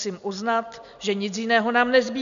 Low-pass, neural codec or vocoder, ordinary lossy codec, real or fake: 7.2 kHz; none; MP3, 96 kbps; real